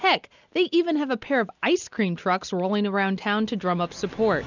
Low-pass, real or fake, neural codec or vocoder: 7.2 kHz; real; none